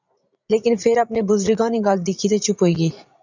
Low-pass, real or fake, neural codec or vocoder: 7.2 kHz; fake; vocoder, 44.1 kHz, 80 mel bands, Vocos